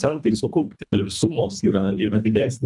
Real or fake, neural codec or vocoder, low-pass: fake; codec, 24 kHz, 1.5 kbps, HILCodec; 10.8 kHz